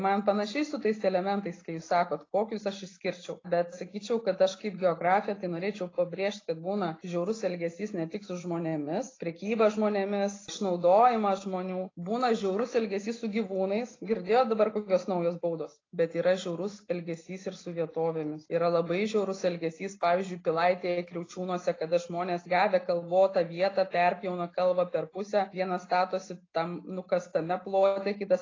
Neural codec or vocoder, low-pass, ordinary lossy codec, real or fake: none; 7.2 kHz; AAC, 32 kbps; real